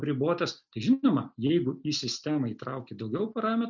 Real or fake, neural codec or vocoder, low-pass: real; none; 7.2 kHz